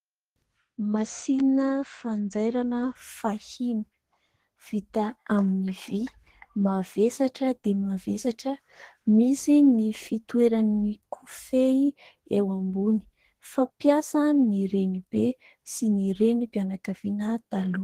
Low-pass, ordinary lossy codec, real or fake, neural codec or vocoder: 14.4 kHz; Opus, 16 kbps; fake; codec, 32 kHz, 1.9 kbps, SNAC